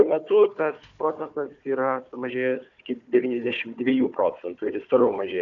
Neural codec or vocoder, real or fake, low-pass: codec, 16 kHz, 4 kbps, FunCodec, trained on Chinese and English, 50 frames a second; fake; 7.2 kHz